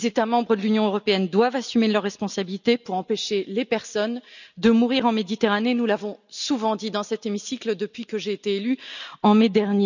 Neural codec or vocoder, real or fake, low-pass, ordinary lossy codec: none; real; 7.2 kHz; none